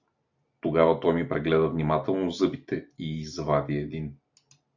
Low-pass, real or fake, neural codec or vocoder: 7.2 kHz; real; none